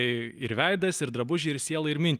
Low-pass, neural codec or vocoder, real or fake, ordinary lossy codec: 14.4 kHz; none; real; Opus, 32 kbps